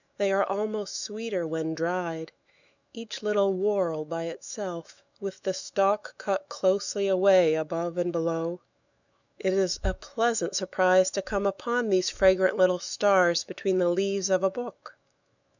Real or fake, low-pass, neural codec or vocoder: fake; 7.2 kHz; autoencoder, 48 kHz, 128 numbers a frame, DAC-VAE, trained on Japanese speech